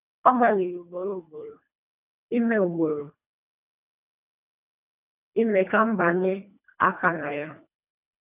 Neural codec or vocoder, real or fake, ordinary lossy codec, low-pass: codec, 24 kHz, 1.5 kbps, HILCodec; fake; none; 3.6 kHz